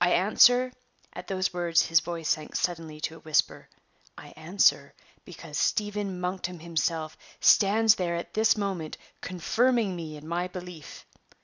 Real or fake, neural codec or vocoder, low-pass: real; none; 7.2 kHz